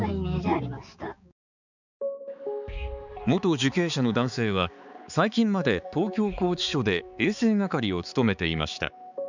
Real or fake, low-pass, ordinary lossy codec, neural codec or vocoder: fake; 7.2 kHz; none; codec, 16 kHz, 4 kbps, X-Codec, HuBERT features, trained on balanced general audio